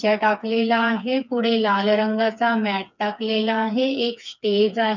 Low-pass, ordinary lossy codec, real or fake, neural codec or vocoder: 7.2 kHz; none; fake; codec, 16 kHz, 4 kbps, FreqCodec, smaller model